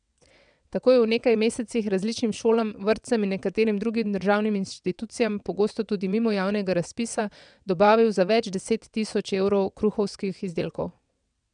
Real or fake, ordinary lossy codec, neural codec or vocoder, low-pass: fake; none; vocoder, 22.05 kHz, 80 mel bands, WaveNeXt; 9.9 kHz